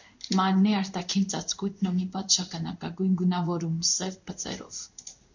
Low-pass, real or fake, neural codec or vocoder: 7.2 kHz; fake; codec, 16 kHz in and 24 kHz out, 1 kbps, XY-Tokenizer